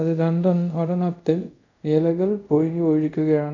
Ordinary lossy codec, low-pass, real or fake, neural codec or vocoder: none; 7.2 kHz; fake; codec, 24 kHz, 0.5 kbps, DualCodec